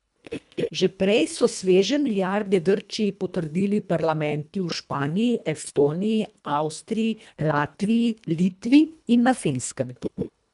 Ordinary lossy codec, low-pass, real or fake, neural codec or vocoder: MP3, 96 kbps; 10.8 kHz; fake; codec, 24 kHz, 1.5 kbps, HILCodec